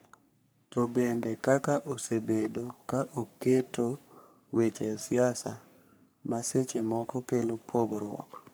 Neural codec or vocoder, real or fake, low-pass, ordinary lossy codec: codec, 44.1 kHz, 3.4 kbps, Pupu-Codec; fake; none; none